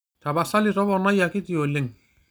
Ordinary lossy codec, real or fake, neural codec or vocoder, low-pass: none; real; none; none